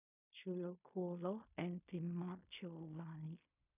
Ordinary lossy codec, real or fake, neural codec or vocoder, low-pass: none; fake; codec, 16 kHz in and 24 kHz out, 0.4 kbps, LongCat-Audio-Codec, fine tuned four codebook decoder; 3.6 kHz